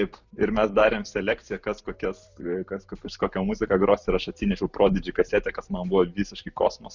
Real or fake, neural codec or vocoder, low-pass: real; none; 7.2 kHz